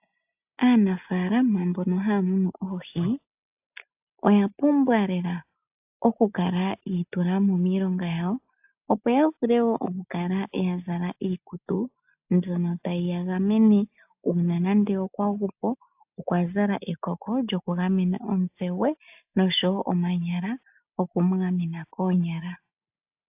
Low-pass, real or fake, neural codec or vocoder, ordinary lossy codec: 3.6 kHz; real; none; AAC, 32 kbps